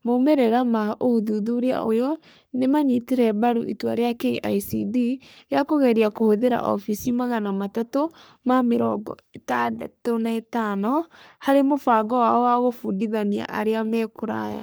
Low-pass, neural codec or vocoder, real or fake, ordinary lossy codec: none; codec, 44.1 kHz, 3.4 kbps, Pupu-Codec; fake; none